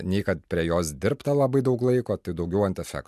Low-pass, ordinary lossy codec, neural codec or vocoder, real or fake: 14.4 kHz; MP3, 96 kbps; none; real